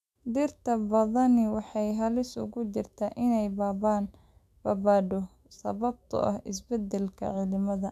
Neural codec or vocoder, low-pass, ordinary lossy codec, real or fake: autoencoder, 48 kHz, 128 numbers a frame, DAC-VAE, trained on Japanese speech; 14.4 kHz; AAC, 96 kbps; fake